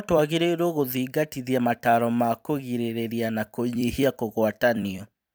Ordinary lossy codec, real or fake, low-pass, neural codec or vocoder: none; fake; none; vocoder, 44.1 kHz, 128 mel bands every 256 samples, BigVGAN v2